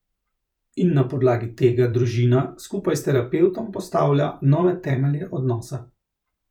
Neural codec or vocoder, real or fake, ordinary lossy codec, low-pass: vocoder, 44.1 kHz, 128 mel bands every 512 samples, BigVGAN v2; fake; none; 19.8 kHz